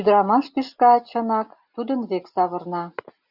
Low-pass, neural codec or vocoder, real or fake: 5.4 kHz; none; real